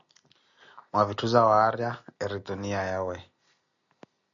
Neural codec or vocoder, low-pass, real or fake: none; 7.2 kHz; real